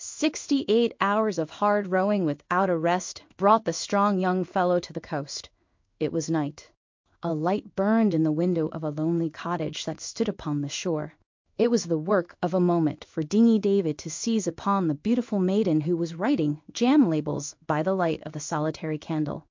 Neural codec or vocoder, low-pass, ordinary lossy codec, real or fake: codec, 16 kHz in and 24 kHz out, 1 kbps, XY-Tokenizer; 7.2 kHz; MP3, 48 kbps; fake